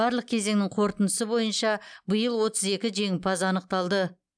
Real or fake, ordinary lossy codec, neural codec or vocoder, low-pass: real; none; none; 9.9 kHz